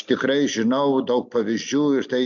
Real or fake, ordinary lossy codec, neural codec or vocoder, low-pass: real; MP3, 64 kbps; none; 7.2 kHz